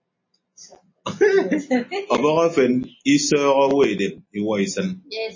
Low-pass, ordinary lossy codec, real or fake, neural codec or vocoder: 7.2 kHz; MP3, 32 kbps; real; none